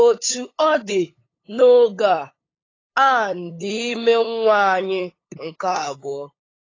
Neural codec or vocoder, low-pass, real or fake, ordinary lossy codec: codec, 16 kHz, 8 kbps, FunCodec, trained on LibriTTS, 25 frames a second; 7.2 kHz; fake; AAC, 32 kbps